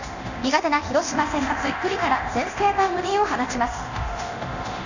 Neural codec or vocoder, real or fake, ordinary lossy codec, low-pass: codec, 24 kHz, 0.9 kbps, DualCodec; fake; none; 7.2 kHz